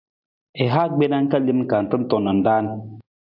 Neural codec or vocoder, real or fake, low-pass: none; real; 5.4 kHz